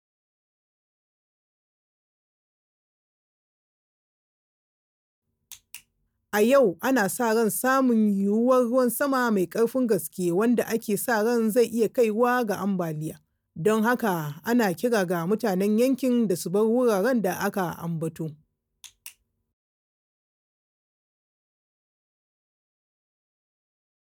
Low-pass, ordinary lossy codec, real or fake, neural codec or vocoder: none; none; real; none